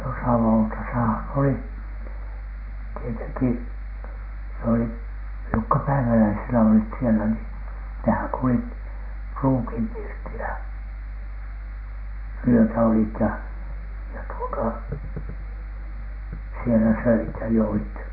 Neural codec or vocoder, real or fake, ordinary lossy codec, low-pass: none; real; none; 5.4 kHz